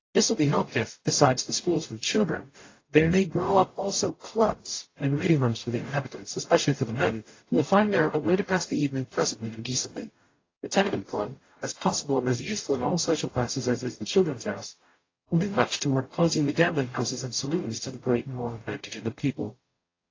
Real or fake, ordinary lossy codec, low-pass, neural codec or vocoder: fake; AAC, 32 kbps; 7.2 kHz; codec, 44.1 kHz, 0.9 kbps, DAC